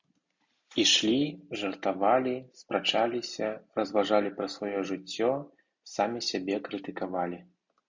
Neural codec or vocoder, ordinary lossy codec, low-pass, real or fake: none; MP3, 64 kbps; 7.2 kHz; real